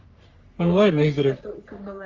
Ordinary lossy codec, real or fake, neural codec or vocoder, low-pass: Opus, 32 kbps; fake; codec, 44.1 kHz, 3.4 kbps, Pupu-Codec; 7.2 kHz